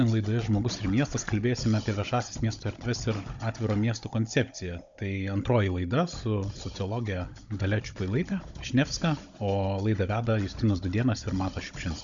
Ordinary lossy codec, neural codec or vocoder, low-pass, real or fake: MP3, 64 kbps; codec, 16 kHz, 16 kbps, FunCodec, trained on Chinese and English, 50 frames a second; 7.2 kHz; fake